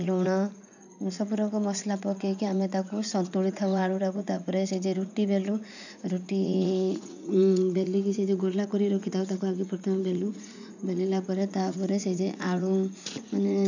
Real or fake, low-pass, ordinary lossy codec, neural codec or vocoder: fake; 7.2 kHz; none; vocoder, 44.1 kHz, 80 mel bands, Vocos